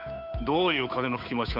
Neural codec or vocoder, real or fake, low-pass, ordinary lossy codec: codec, 16 kHz, 8 kbps, FunCodec, trained on Chinese and English, 25 frames a second; fake; 5.4 kHz; none